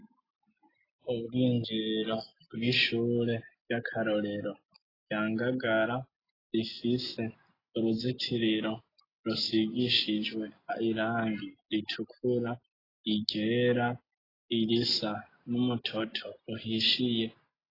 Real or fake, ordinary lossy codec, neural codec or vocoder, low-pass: real; AAC, 24 kbps; none; 5.4 kHz